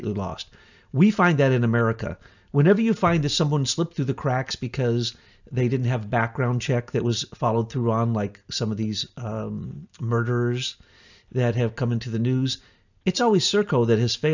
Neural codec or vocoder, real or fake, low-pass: none; real; 7.2 kHz